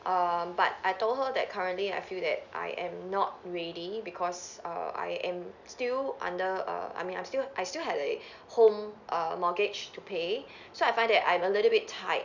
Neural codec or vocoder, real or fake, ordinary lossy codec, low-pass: none; real; none; 7.2 kHz